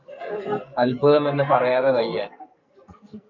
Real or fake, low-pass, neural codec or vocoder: fake; 7.2 kHz; codec, 44.1 kHz, 2.6 kbps, SNAC